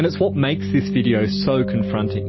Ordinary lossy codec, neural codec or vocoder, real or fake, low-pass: MP3, 24 kbps; none; real; 7.2 kHz